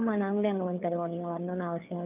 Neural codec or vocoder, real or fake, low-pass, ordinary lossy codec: codec, 16 kHz, 4 kbps, FreqCodec, larger model; fake; 3.6 kHz; none